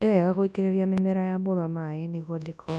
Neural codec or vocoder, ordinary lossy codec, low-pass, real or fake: codec, 24 kHz, 0.9 kbps, WavTokenizer, large speech release; none; none; fake